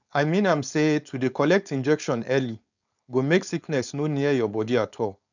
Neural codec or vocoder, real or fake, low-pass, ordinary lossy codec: codec, 16 kHz, 4.8 kbps, FACodec; fake; 7.2 kHz; none